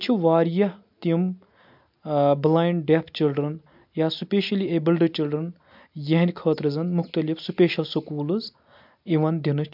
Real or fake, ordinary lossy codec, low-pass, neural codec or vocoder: real; MP3, 48 kbps; 5.4 kHz; none